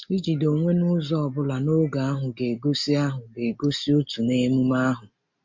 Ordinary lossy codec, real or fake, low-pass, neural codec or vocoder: MP3, 48 kbps; real; 7.2 kHz; none